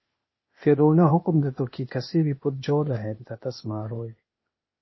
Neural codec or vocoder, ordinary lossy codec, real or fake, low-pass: codec, 16 kHz, 0.8 kbps, ZipCodec; MP3, 24 kbps; fake; 7.2 kHz